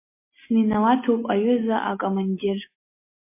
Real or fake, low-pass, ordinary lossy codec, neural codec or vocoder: real; 3.6 kHz; MP3, 24 kbps; none